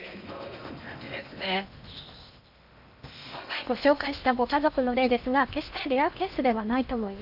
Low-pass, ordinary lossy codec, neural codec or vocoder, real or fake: 5.4 kHz; none; codec, 16 kHz in and 24 kHz out, 0.8 kbps, FocalCodec, streaming, 65536 codes; fake